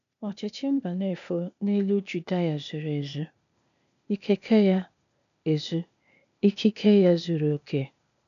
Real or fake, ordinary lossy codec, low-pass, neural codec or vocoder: fake; none; 7.2 kHz; codec, 16 kHz, 0.8 kbps, ZipCodec